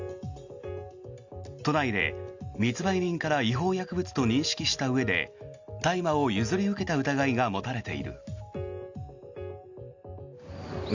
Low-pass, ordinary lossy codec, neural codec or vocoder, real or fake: 7.2 kHz; Opus, 64 kbps; none; real